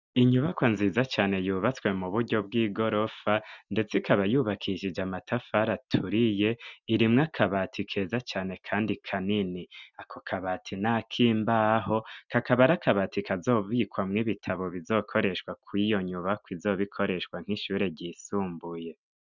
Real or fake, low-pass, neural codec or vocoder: real; 7.2 kHz; none